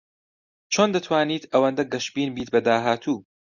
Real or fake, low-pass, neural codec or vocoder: real; 7.2 kHz; none